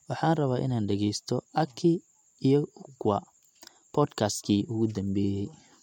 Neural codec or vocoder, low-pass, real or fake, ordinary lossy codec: none; 19.8 kHz; real; MP3, 64 kbps